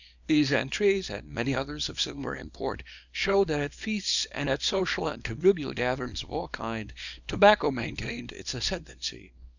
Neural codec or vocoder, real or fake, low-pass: codec, 24 kHz, 0.9 kbps, WavTokenizer, small release; fake; 7.2 kHz